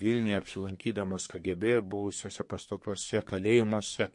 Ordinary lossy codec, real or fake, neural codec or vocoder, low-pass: MP3, 48 kbps; fake; codec, 24 kHz, 1 kbps, SNAC; 10.8 kHz